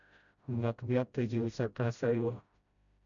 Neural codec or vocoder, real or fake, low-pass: codec, 16 kHz, 0.5 kbps, FreqCodec, smaller model; fake; 7.2 kHz